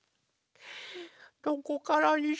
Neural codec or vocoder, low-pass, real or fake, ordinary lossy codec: none; none; real; none